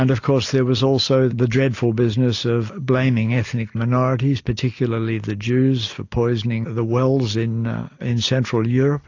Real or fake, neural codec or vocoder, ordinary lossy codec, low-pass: real; none; AAC, 48 kbps; 7.2 kHz